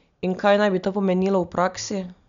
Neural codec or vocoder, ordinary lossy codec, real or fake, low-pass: none; MP3, 96 kbps; real; 7.2 kHz